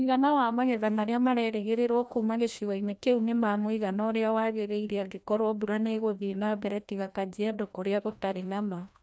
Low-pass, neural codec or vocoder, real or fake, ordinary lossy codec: none; codec, 16 kHz, 1 kbps, FreqCodec, larger model; fake; none